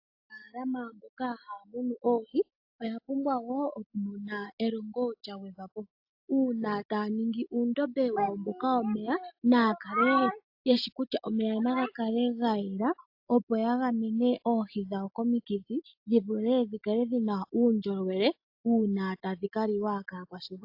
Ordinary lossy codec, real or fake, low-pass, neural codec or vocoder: AAC, 48 kbps; real; 5.4 kHz; none